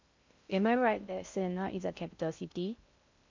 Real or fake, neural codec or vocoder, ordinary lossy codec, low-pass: fake; codec, 16 kHz in and 24 kHz out, 0.6 kbps, FocalCodec, streaming, 2048 codes; MP3, 64 kbps; 7.2 kHz